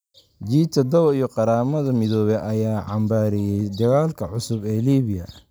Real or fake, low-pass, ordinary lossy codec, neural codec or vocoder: real; none; none; none